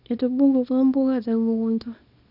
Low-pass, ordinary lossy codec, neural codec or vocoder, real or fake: 5.4 kHz; none; codec, 24 kHz, 0.9 kbps, WavTokenizer, small release; fake